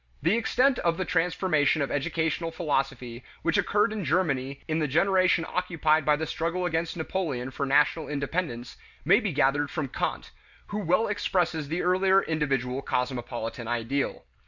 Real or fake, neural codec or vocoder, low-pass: real; none; 7.2 kHz